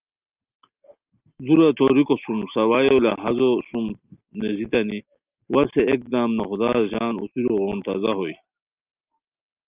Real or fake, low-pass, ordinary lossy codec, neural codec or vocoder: real; 3.6 kHz; Opus, 24 kbps; none